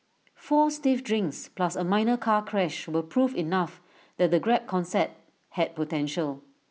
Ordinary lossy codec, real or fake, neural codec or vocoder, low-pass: none; real; none; none